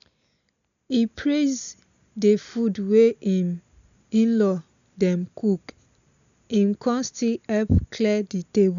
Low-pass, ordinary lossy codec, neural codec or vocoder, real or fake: 7.2 kHz; none; none; real